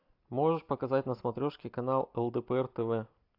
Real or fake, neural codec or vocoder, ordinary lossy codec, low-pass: real; none; AAC, 48 kbps; 5.4 kHz